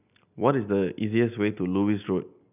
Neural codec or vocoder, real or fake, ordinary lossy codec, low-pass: none; real; none; 3.6 kHz